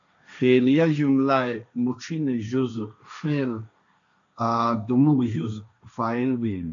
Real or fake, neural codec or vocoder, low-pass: fake; codec, 16 kHz, 1.1 kbps, Voila-Tokenizer; 7.2 kHz